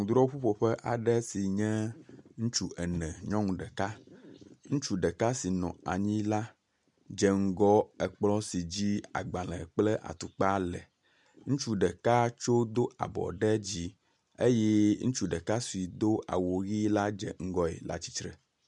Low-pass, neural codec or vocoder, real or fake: 10.8 kHz; none; real